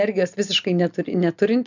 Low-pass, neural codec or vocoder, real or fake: 7.2 kHz; none; real